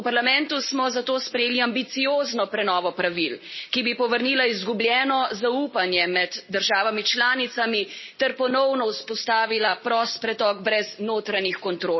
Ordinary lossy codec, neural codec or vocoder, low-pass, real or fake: MP3, 24 kbps; none; 7.2 kHz; real